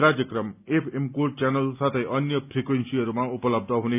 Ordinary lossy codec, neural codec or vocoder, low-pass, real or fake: none; none; 3.6 kHz; real